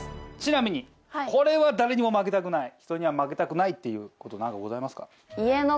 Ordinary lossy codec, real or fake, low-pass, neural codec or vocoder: none; real; none; none